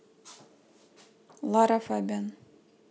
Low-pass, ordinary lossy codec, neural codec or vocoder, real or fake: none; none; none; real